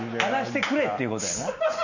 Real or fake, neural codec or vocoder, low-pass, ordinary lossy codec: real; none; 7.2 kHz; none